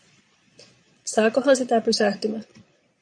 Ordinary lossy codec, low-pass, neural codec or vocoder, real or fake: MP3, 96 kbps; 9.9 kHz; vocoder, 22.05 kHz, 80 mel bands, Vocos; fake